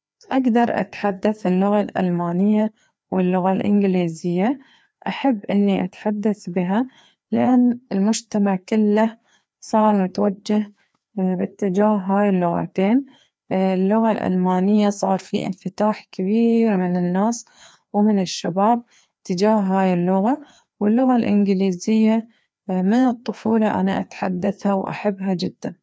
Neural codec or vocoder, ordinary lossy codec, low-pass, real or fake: codec, 16 kHz, 2 kbps, FreqCodec, larger model; none; none; fake